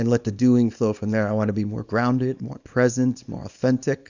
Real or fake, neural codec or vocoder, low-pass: fake; codec, 24 kHz, 0.9 kbps, WavTokenizer, small release; 7.2 kHz